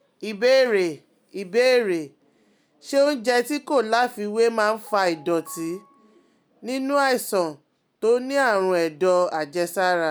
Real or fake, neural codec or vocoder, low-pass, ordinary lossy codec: real; none; none; none